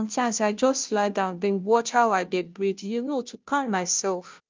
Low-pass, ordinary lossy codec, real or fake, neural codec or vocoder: 7.2 kHz; Opus, 24 kbps; fake; codec, 16 kHz, 0.5 kbps, FunCodec, trained on Chinese and English, 25 frames a second